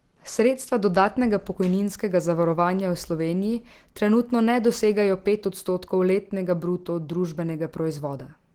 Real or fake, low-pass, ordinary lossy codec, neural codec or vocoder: real; 19.8 kHz; Opus, 16 kbps; none